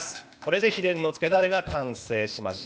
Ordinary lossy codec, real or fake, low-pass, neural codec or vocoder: none; fake; none; codec, 16 kHz, 0.8 kbps, ZipCodec